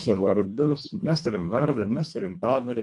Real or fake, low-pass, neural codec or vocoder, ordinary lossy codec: fake; 10.8 kHz; codec, 24 kHz, 1.5 kbps, HILCodec; AAC, 64 kbps